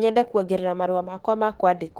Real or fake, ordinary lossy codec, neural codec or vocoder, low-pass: fake; Opus, 16 kbps; autoencoder, 48 kHz, 32 numbers a frame, DAC-VAE, trained on Japanese speech; 19.8 kHz